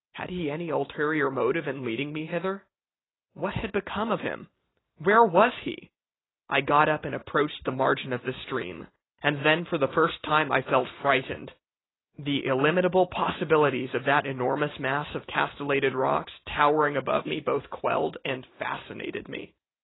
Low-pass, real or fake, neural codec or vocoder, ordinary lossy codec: 7.2 kHz; real; none; AAC, 16 kbps